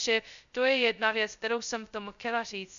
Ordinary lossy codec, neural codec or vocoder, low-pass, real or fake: MP3, 96 kbps; codec, 16 kHz, 0.2 kbps, FocalCodec; 7.2 kHz; fake